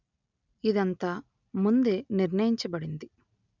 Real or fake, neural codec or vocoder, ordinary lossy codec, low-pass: real; none; none; 7.2 kHz